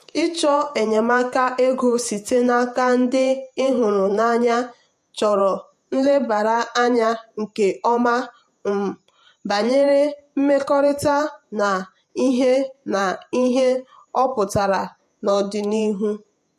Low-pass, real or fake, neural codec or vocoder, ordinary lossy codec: 14.4 kHz; fake; vocoder, 48 kHz, 128 mel bands, Vocos; MP3, 64 kbps